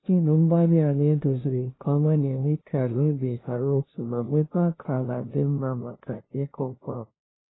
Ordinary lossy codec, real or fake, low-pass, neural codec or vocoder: AAC, 16 kbps; fake; 7.2 kHz; codec, 16 kHz, 0.5 kbps, FunCodec, trained on LibriTTS, 25 frames a second